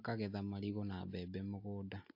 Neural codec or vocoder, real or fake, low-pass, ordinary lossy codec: none; real; 5.4 kHz; AAC, 48 kbps